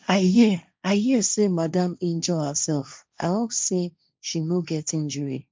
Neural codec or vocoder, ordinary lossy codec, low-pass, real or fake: codec, 16 kHz, 1.1 kbps, Voila-Tokenizer; none; none; fake